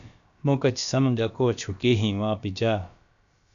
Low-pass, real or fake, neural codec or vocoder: 7.2 kHz; fake; codec, 16 kHz, about 1 kbps, DyCAST, with the encoder's durations